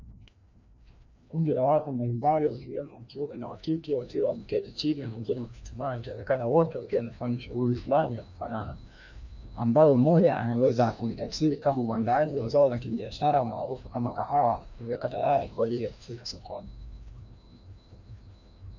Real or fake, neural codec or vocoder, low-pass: fake; codec, 16 kHz, 1 kbps, FreqCodec, larger model; 7.2 kHz